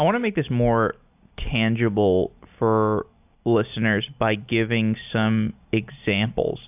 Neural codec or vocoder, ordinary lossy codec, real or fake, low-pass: none; AAC, 32 kbps; real; 3.6 kHz